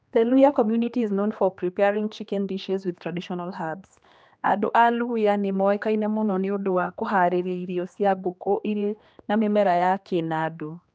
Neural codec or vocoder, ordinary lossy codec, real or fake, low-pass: codec, 16 kHz, 2 kbps, X-Codec, HuBERT features, trained on general audio; none; fake; none